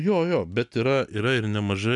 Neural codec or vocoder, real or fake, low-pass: none; real; 10.8 kHz